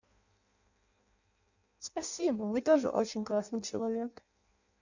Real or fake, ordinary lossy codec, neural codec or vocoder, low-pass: fake; none; codec, 16 kHz in and 24 kHz out, 0.6 kbps, FireRedTTS-2 codec; 7.2 kHz